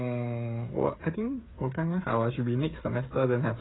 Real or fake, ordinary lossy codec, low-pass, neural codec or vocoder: fake; AAC, 16 kbps; 7.2 kHz; codec, 16 kHz, 8 kbps, FreqCodec, smaller model